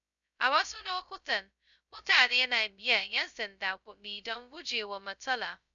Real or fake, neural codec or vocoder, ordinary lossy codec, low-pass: fake; codec, 16 kHz, 0.2 kbps, FocalCodec; none; 7.2 kHz